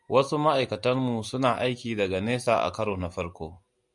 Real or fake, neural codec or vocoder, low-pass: real; none; 10.8 kHz